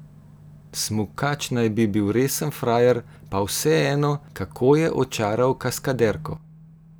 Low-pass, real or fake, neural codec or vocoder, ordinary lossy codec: none; real; none; none